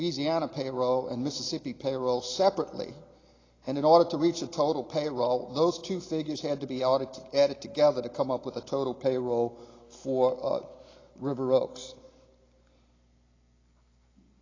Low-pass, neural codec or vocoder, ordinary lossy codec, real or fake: 7.2 kHz; none; AAC, 32 kbps; real